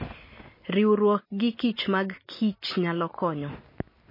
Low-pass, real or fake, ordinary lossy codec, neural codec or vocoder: 5.4 kHz; real; MP3, 24 kbps; none